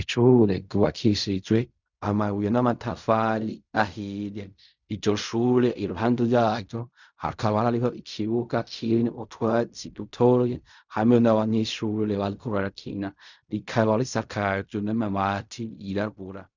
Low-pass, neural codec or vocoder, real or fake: 7.2 kHz; codec, 16 kHz in and 24 kHz out, 0.4 kbps, LongCat-Audio-Codec, fine tuned four codebook decoder; fake